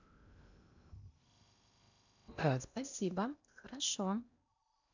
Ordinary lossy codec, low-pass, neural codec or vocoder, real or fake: none; 7.2 kHz; codec, 16 kHz in and 24 kHz out, 0.8 kbps, FocalCodec, streaming, 65536 codes; fake